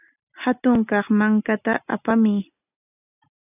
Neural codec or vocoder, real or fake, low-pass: none; real; 3.6 kHz